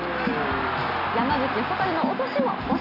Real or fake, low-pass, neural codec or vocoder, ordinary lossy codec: real; 5.4 kHz; none; none